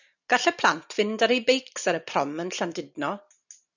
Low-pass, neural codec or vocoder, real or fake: 7.2 kHz; none; real